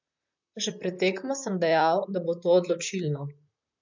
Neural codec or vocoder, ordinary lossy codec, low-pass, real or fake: vocoder, 44.1 kHz, 128 mel bands, Pupu-Vocoder; MP3, 64 kbps; 7.2 kHz; fake